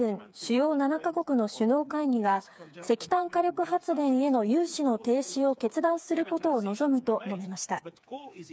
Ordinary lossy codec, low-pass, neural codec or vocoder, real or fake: none; none; codec, 16 kHz, 4 kbps, FreqCodec, smaller model; fake